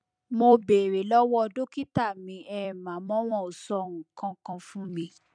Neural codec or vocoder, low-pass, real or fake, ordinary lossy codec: vocoder, 44.1 kHz, 128 mel bands every 256 samples, BigVGAN v2; 9.9 kHz; fake; none